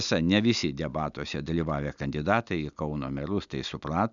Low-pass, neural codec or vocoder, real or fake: 7.2 kHz; none; real